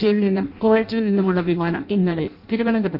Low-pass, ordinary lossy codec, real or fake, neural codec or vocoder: 5.4 kHz; none; fake; codec, 16 kHz in and 24 kHz out, 0.6 kbps, FireRedTTS-2 codec